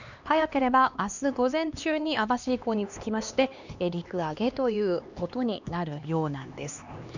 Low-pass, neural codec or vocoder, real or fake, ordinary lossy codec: 7.2 kHz; codec, 16 kHz, 2 kbps, X-Codec, HuBERT features, trained on LibriSpeech; fake; none